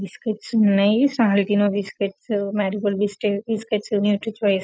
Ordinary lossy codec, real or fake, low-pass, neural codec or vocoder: none; real; none; none